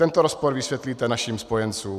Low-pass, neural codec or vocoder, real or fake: 14.4 kHz; none; real